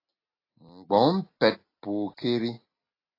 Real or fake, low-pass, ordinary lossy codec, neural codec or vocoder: real; 5.4 kHz; AAC, 24 kbps; none